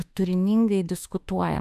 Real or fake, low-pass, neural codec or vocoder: fake; 14.4 kHz; autoencoder, 48 kHz, 32 numbers a frame, DAC-VAE, trained on Japanese speech